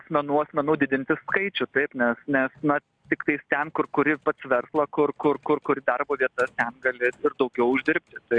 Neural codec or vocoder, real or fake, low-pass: none; real; 9.9 kHz